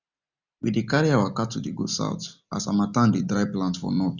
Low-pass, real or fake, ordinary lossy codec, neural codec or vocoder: 7.2 kHz; real; none; none